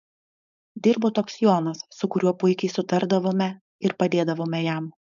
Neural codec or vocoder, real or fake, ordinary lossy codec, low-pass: codec, 16 kHz, 4.8 kbps, FACodec; fake; AAC, 96 kbps; 7.2 kHz